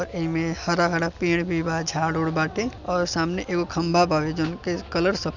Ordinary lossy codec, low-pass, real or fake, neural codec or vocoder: none; 7.2 kHz; real; none